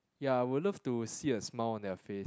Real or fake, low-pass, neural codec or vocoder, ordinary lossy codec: real; none; none; none